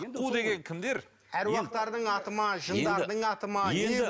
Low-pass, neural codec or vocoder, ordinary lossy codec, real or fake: none; none; none; real